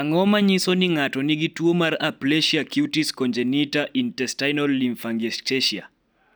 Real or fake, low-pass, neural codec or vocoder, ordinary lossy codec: real; none; none; none